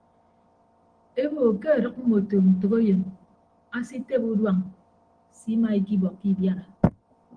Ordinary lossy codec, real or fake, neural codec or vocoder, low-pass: Opus, 16 kbps; real; none; 9.9 kHz